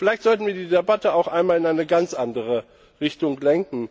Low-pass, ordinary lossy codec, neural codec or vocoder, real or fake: none; none; none; real